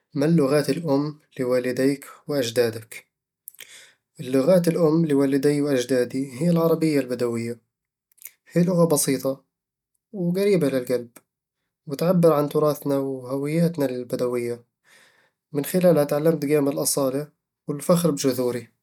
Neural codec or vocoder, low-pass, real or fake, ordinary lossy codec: none; 19.8 kHz; real; none